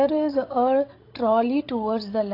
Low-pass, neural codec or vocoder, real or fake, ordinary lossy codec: 5.4 kHz; codec, 16 kHz, 16 kbps, FreqCodec, larger model; fake; AAC, 32 kbps